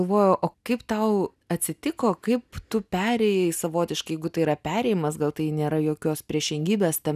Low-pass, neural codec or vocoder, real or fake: 14.4 kHz; none; real